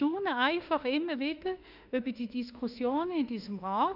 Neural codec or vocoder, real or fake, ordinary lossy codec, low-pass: autoencoder, 48 kHz, 32 numbers a frame, DAC-VAE, trained on Japanese speech; fake; none; 5.4 kHz